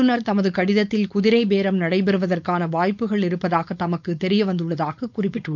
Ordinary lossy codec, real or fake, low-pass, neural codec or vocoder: none; fake; 7.2 kHz; codec, 16 kHz, 4.8 kbps, FACodec